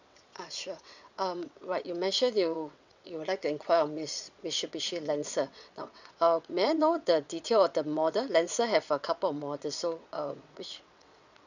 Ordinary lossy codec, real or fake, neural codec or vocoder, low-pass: none; fake; vocoder, 22.05 kHz, 80 mel bands, WaveNeXt; 7.2 kHz